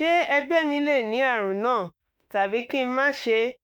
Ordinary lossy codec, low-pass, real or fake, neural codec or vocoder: none; none; fake; autoencoder, 48 kHz, 32 numbers a frame, DAC-VAE, trained on Japanese speech